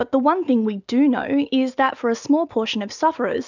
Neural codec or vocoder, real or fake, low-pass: none; real; 7.2 kHz